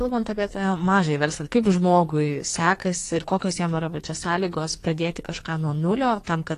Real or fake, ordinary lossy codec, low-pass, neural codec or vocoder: fake; AAC, 48 kbps; 14.4 kHz; codec, 44.1 kHz, 2.6 kbps, SNAC